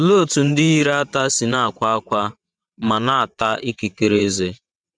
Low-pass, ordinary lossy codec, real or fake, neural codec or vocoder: 9.9 kHz; none; fake; vocoder, 22.05 kHz, 80 mel bands, WaveNeXt